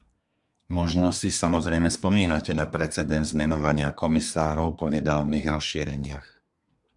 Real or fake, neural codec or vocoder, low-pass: fake; codec, 24 kHz, 1 kbps, SNAC; 10.8 kHz